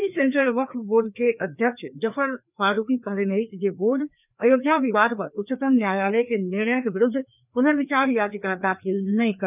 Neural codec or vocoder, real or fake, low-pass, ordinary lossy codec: codec, 16 kHz, 2 kbps, FreqCodec, larger model; fake; 3.6 kHz; none